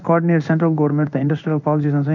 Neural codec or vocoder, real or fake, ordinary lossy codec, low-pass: codec, 16 kHz in and 24 kHz out, 1 kbps, XY-Tokenizer; fake; none; 7.2 kHz